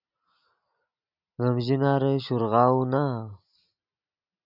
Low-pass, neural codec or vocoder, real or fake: 5.4 kHz; none; real